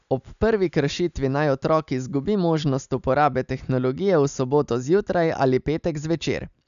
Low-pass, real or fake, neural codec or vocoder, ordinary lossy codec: 7.2 kHz; real; none; none